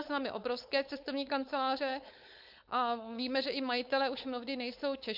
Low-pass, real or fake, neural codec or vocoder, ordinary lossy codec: 5.4 kHz; fake; codec, 16 kHz, 4.8 kbps, FACodec; MP3, 48 kbps